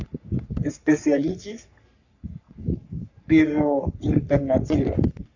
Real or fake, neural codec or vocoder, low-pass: fake; codec, 44.1 kHz, 3.4 kbps, Pupu-Codec; 7.2 kHz